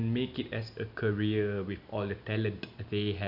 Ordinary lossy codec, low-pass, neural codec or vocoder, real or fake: none; 5.4 kHz; none; real